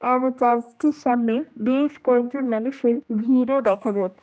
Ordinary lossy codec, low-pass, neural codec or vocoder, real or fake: none; none; codec, 16 kHz, 1 kbps, X-Codec, HuBERT features, trained on general audio; fake